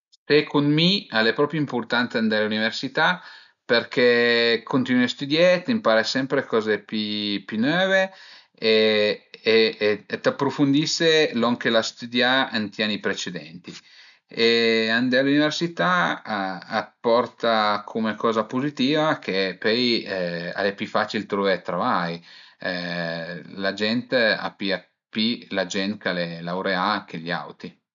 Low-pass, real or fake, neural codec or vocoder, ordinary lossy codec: 7.2 kHz; real; none; none